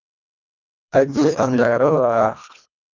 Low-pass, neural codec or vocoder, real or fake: 7.2 kHz; codec, 24 kHz, 1.5 kbps, HILCodec; fake